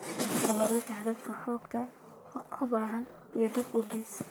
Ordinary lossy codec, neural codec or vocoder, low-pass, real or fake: none; codec, 44.1 kHz, 1.7 kbps, Pupu-Codec; none; fake